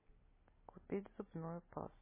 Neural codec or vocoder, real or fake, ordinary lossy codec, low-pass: none; real; MP3, 16 kbps; 3.6 kHz